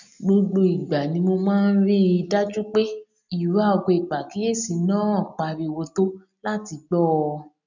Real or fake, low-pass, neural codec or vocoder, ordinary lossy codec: real; 7.2 kHz; none; none